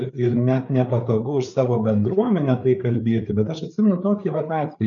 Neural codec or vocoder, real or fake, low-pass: codec, 16 kHz, 4 kbps, FreqCodec, larger model; fake; 7.2 kHz